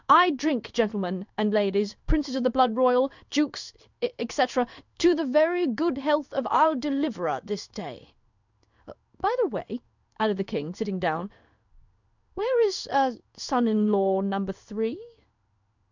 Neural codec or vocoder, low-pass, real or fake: codec, 16 kHz in and 24 kHz out, 1 kbps, XY-Tokenizer; 7.2 kHz; fake